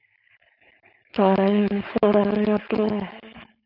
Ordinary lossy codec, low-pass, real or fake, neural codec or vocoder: AAC, 48 kbps; 5.4 kHz; fake; codec, 16 kHz, 4.8 kbps, FACodec